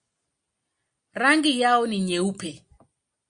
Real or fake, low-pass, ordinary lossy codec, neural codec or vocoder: real; 9.9 kHz; MP3, 48 kbps; none